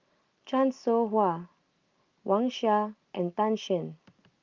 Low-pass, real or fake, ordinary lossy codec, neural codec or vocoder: 7.2 kHz; real; Opus, 32 kbps; none